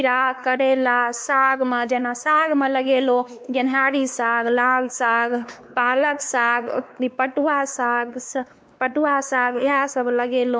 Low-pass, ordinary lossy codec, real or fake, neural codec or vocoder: none; none; fake; codec, 16 kHz, 2 kbps, X-Codec, WavLM features, trained on Multilingual LibriSpeech